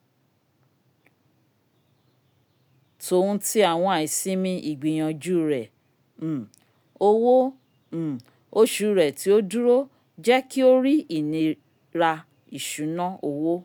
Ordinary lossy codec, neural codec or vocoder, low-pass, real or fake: none; none; none; real